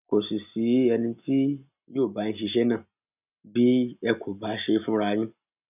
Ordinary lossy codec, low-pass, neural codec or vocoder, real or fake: none; 3.6 kHz; none; real